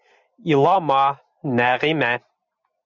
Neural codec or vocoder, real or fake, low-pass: none; real; 7.2 kHz